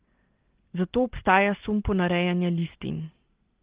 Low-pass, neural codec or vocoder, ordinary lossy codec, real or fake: 3.6 kHz; none; Opus, 16 kbps; real